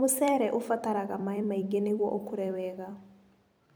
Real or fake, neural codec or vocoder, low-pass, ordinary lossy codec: fake; vocoder, 44.1 kHz, 128 mel bands every 256 samples, BigVGAN v2; none; none